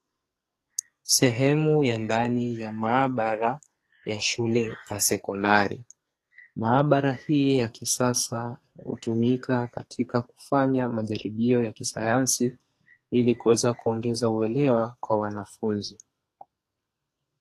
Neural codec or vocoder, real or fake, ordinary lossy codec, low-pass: codec, 44.1 kHz, 2.6 kbps, SNAC; fake; AAC, 48 kbps; 14.4 kHz